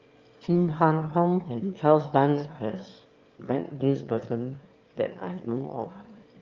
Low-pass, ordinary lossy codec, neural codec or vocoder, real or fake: 7.2 kHz; Opus, 32 kbps; autoencoder, 22.05 kHz, a latent of 192 numbers a frame, VITS, trained on one speaker; fake